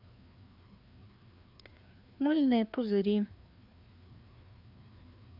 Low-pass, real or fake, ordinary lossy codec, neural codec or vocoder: 5.4 kHz; fake; none; codec, 16 kHz, 2 kbps, FreqCodec, larger model